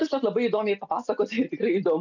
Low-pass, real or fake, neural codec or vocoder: 7.2 kHz; real; none